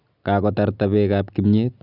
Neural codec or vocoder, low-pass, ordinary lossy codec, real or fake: none; 5.4 kHz; none; real